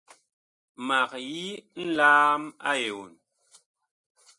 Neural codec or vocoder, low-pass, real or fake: none; 10.8 kHz; real